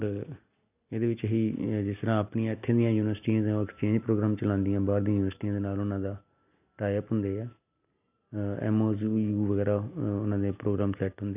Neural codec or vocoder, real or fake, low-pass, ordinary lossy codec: none; real; 3.6 kHz; none